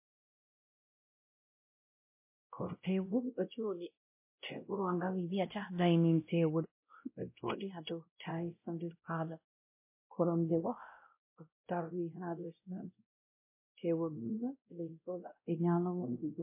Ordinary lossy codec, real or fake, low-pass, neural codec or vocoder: MP3, 24 kbps; fake; 3.6 kHz; codec, 16 kHz, 0.5 kbps, X-Codec, WavLM features, trained on Multilingual LibriSpeech